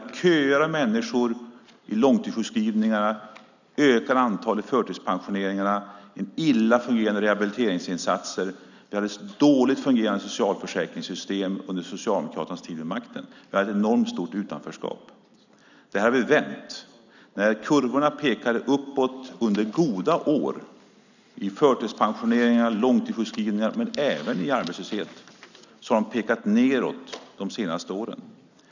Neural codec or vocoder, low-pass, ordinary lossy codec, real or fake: none; 7.2 kHz; none; real